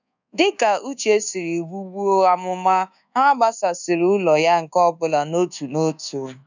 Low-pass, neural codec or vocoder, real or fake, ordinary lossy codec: 7.2 kHz; codec, 24 kHz, 1.2 kbps, DualCodec; fake; none